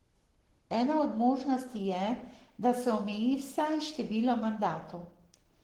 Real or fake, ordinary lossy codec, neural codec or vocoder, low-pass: fake; Opus, 16 kbps; codec, 44.1 kHz, 7.8 kbps, Pupu-Codec; 19.8 kHz